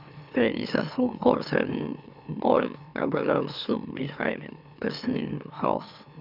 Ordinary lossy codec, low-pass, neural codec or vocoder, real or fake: none; 5.4 kHz; autoencoder, 44.1 kHz, a latent of 192 numbers a frame, MeloTTS; fake